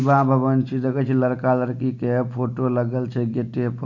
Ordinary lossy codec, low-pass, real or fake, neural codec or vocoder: none; 7.2 kHz; real; none